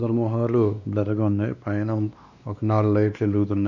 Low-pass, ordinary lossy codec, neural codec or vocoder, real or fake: 7.2 kHz; none; codec, 16 kHz, 2 kbps, X-Codec, WavLM features, trained on Multilingual LibriSpeech; fake